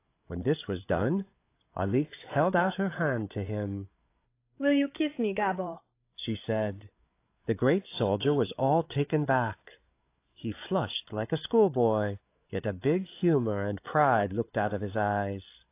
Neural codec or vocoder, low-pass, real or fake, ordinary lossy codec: codec, 16 kHz, 8 kbps, FreqCodec, larger model; 3.6 kHz; fake; AAC, 24 kbps